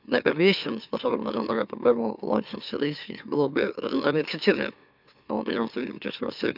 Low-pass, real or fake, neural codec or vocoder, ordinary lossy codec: 5.4 kHz; fake; autoencoder, 44.1 kHz, a latent of 192 numbers a frame, MeloTTS; none